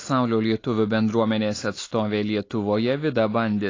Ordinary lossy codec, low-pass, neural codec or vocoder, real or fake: AAC, 32 kbps; 7.2 kHz; none; real